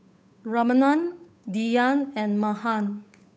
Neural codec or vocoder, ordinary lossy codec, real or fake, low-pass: codec, 16 kHz, 8 kbps, FunCodec, trained on Chinese and English, 25 frames a second; none; fake; none